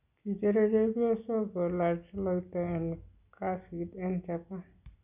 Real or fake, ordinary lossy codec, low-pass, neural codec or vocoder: real; none; 3.6 kHz; none